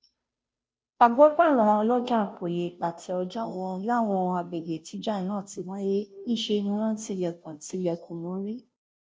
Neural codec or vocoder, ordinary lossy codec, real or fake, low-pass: codec, 16 kHz, 0.5 kbps, FunCodec, trained on Chinese and English, 25 frames a second; none; fake; none